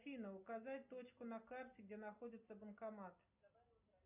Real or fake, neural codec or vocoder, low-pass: real; none; 3.6 kHz